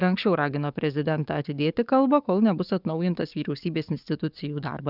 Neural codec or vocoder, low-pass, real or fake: codec, 44.1 kHz, 7.8 kbps, DAC; 5.4 kHz; fake